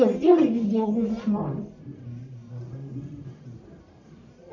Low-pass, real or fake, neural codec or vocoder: 7.2 kHz; fake; codec, 44.1 kHz, 1.7 kbps, Pupu-Codec